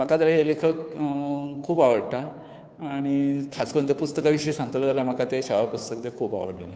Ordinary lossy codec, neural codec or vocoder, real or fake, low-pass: none; codec, 16 kHz, 2 kbps, FunCodec, trained on Chinese and English, 25 frames a second; fake; none